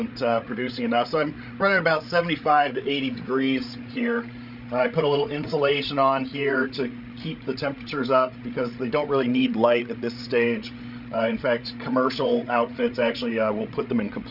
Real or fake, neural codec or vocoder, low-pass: fake; codec, 16 kHz, 16 kbps, FreqCodec, larger model; 5.4 kHz